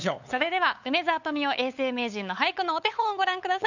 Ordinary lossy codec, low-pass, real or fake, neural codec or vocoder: none; 7.2 kHz; fake; codec, 16 kHz, 8 kbps, FunCodec, trained on LibriTTS, 25 frames a second